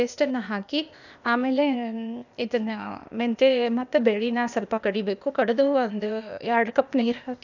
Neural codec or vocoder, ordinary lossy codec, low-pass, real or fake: codec, 16 kHz, 0.8 kbps, ZipCodec; none; 7.2 kHz; fake